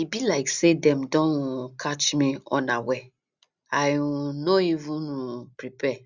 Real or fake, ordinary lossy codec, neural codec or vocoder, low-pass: real; Opus, 64 kbps; none; 7.2 kHz